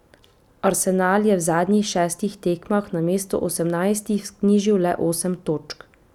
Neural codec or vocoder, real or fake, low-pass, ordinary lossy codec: none; real; 19.8 kHz; none